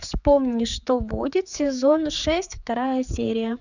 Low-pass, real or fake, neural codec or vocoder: 7.2 kHz; fake; codec, 16 kHz, 4 kbps, X-Codec, HuBERT features, trained on general audio